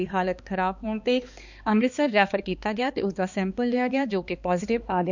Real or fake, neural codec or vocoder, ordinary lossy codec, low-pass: fake; codec, 16 kHz, 2 kbps, X-Codec, HuBERT features, trained on balanced general audio; none; 7.2 kHz